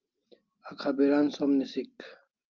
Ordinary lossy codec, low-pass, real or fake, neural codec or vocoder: Opus, 24 kbps; 7.2 kHz; real; none